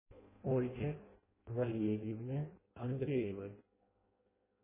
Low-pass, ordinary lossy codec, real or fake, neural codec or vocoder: 3.6 kHz; MP3, 16 kbps; fake; codec, 16 kHz in and 24 kHz out, 0.6 kbps, FireRedTTS-2 codec